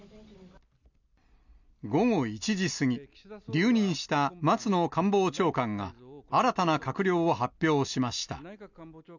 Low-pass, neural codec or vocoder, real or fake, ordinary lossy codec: 7.2 kHz; none; real; none